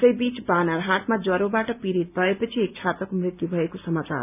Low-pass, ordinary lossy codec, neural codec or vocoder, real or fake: 3.6 kHz; none; none; real